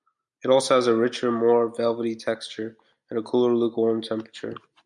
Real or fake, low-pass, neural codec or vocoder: real; 10.8 kHz; none